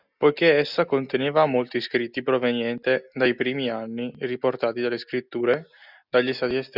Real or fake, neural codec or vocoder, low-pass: real; none; 5.4 kHz